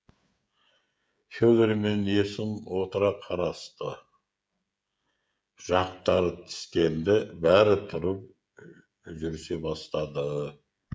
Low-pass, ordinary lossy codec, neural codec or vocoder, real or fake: none; none; codec, 16 kHz, 16 kbps, FreqCodec, smaller model; fake